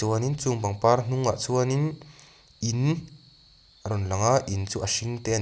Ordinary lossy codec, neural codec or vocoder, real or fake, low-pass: none; none; real; none